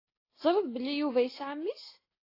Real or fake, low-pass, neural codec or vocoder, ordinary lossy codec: real; 5.4 kHz; none; AAC, 24 kbps